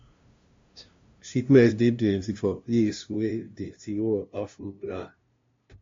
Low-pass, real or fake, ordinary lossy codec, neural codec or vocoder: 7.2 kHz; fake; MP3, 48 kbps; codec, 16 kHz, 0.5 kbps, FunCodec, trained on LibriTTS, 25 frames a second